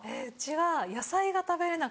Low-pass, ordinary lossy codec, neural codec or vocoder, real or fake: none; none; none; real